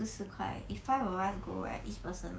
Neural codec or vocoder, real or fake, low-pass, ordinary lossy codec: codec, 16 kHz, 6 kbps, DAC; fake; none; none